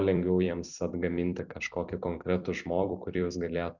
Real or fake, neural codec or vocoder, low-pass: fake; vocoder, 44.1 kHz, 128 mel bands every 256 samples, BigVGAN v2; 7.2 kHz